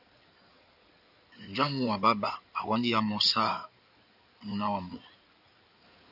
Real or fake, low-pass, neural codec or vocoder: fake; 5.4 kHz; codec, 16 kHz in and 24 kHz out, 2.2 kbps, FireRedTTS-2 codec